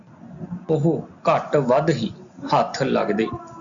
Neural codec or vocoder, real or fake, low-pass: none; real; 7.2 kHz